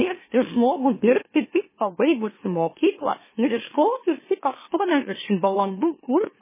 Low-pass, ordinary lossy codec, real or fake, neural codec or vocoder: 3.6 kHz; MP3, 16 kbps; fake; autoencoder, 44.1 kHz, a latent of 192 numbers a frame, MeloTTS